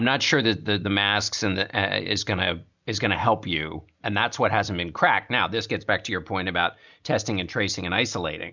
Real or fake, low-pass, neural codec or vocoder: real; 7.2 kHz; none